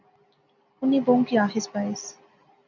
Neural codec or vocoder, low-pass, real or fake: none; 7.2 kHz; real